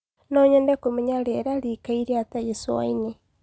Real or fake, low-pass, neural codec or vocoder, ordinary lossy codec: real; none; none; none